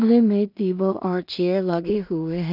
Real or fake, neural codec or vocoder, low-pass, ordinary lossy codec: fake; codec, 16 kHz in and 24 kHz out, 0.4 kbps, LongCat-Audio-Codec, two codebook decoder; 5.4 kHz; none